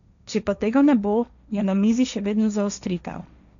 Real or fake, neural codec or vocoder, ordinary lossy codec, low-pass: fake; codec, 16 kHz, 1.1 kbps, Voila-Tokenizer; none; 7.2 kHz